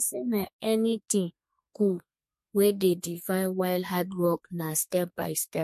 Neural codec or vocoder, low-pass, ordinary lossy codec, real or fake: codec, 32 kHz, 1.9 kbps, SNAC; 14.4 kHz; MP3, 64 kbps; fake